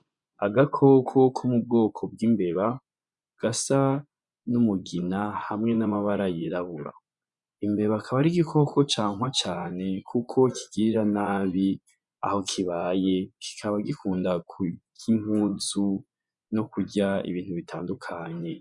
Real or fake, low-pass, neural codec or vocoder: fake; 10.8 kHz; vocoder, 24 kHz, 100 mel bands, Vocos